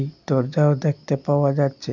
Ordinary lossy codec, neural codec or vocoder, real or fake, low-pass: none; none; real; 7.2 kHz